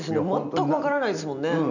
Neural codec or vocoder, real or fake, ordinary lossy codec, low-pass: none; real; none; 7.2 kHz